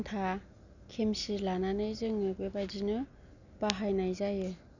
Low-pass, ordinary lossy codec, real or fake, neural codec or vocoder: 7.2 kHz; none; real; none